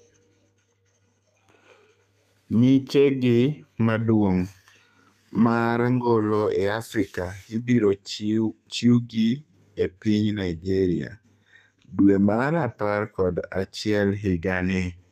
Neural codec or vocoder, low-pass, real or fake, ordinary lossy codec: codec, 32 kHz, 1.9 kbps, SNAC; 14.4 kHz; fake; none